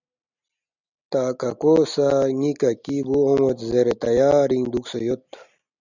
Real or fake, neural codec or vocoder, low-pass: real; none; 7.2 kHz